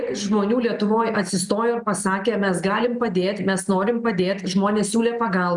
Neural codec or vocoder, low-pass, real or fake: none; 10.8 kHz; real